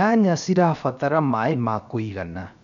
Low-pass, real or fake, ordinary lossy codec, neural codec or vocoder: 7.2 kHz; fake; none; codec, 16 kHz, 0.7 kbps, FocalCodec